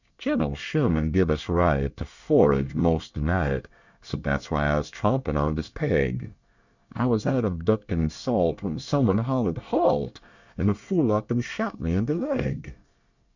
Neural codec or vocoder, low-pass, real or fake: codec, 24 kHz, 1 kbps, SNAC; 7.2 kHz; fake